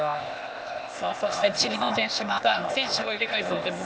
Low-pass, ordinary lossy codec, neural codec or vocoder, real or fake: none; none; codec, 16 kHz, 0.8 kbps, ZipCodec; fake